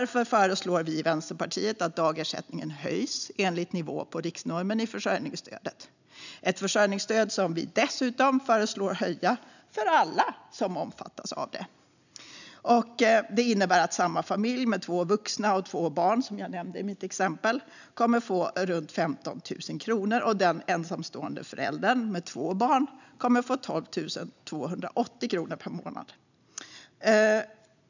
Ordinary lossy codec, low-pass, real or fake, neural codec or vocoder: none; 7.2 kHz; real; none